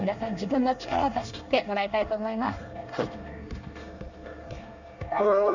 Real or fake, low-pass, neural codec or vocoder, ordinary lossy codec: fake; 7.2 kHz; codec, 24 kHz, 1 kbps, SNAC; none